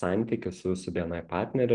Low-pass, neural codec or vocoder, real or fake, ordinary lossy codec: 9.9 kHz; none; real; Opus, 24 kbps